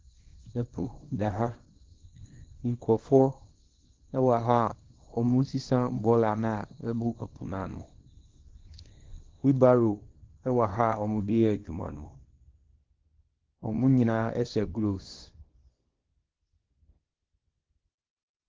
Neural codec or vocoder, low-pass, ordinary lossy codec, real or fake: codec, 24 kHz, 0.9 kbps, WavTokenizer, small release; 7.2 kHz; Opus, 16 kbps; fake